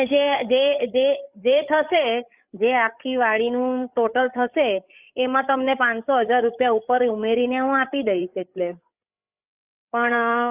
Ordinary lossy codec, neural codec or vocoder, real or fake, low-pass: Opus, 64 kbps; codec, 16 kHz, 8 kbps, FreqCodec, larger model; fake; 3.6 kHz